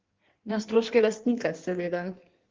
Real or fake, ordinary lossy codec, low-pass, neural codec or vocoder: fake; Opus, 16 kbps; 7.2 kHz; codec, 16 kHz in and 24 kHz out, 1.1 kbps, FireRedTTS-2 codec